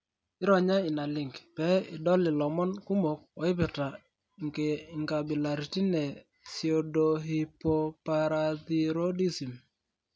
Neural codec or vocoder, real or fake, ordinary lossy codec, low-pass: none; real; none; none